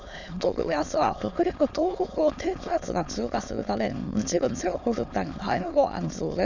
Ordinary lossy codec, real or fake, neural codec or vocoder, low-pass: none; fake; autoencoder, 22.05 kHz, a latent of 192 numbers a frame, VITS, trained on many speakers; 7.2 kHz